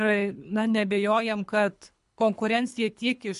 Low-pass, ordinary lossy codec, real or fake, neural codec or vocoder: 10.8 kHz; MP3, 64 kbps; fake; codec, 24 kHz, 3 kbps, HILCodec